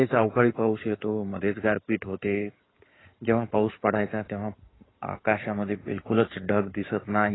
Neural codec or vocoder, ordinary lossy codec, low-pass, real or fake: codec, 16 kHz in and 24 kHz out, 2.2 kbps, FireRedTTS-2 codec; AAC, 16 kbps; 7.2 kHz; fake